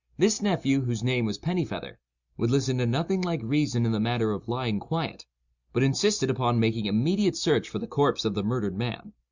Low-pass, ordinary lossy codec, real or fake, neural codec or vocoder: 7.2 kHz; Opus, 64 kbps; real; none